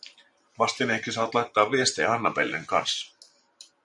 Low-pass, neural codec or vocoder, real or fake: 10.8 kHz; vocoder, 44.1 kHz, 128 mel bands every 512 samples, BigVGAN v2; fake